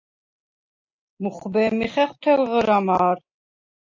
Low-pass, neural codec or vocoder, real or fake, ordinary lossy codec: 7.2 kHz; none; real; MP3, 48 kbps